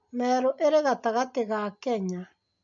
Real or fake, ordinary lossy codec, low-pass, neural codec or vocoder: real; MP3, 48 kbps; 7.2 kHz; none